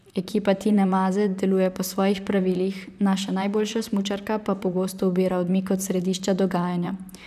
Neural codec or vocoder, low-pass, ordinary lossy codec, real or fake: vocoder, 44.1 kHz, 128 mel bands, Pupu-Vocoder; 14.4 kHz; none; fake